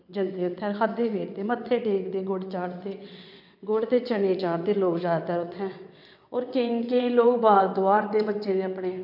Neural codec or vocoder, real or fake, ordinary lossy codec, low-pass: vocoder, 22.05 kHz, 80 mel bands, WaveNeXt; fake; none; 5.4 kHz